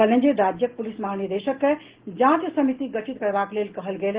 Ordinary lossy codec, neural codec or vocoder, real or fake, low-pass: Opus, 16 kbps; none; real; 3.6 kHz